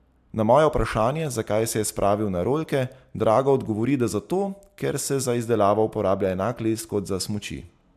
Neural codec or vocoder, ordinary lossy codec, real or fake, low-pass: none; AAC, 96 kbps; real; 14.4 kHz